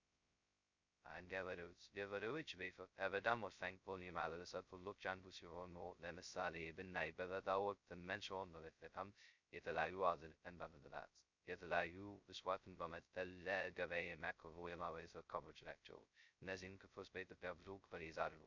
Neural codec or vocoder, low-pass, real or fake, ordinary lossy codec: codec, 16 kHz, 0.2 kbps, FocalCodec; 7.2 kHz; fake; MP3, 64 kbps